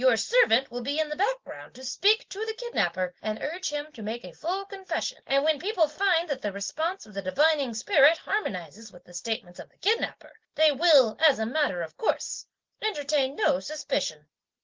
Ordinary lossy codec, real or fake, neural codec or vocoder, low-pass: Opus, 16 kbps; real; none; 7.2 kHz